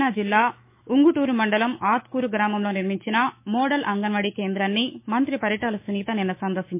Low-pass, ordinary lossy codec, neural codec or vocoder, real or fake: 3.6 kHz; MP3, 24 kbps; autoencoder, 48 kHz, 128 numbers a frame, DAC-VAE, trained on Japanese speech; fake